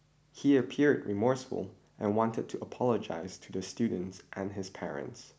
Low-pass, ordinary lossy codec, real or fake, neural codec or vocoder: none; none; real; none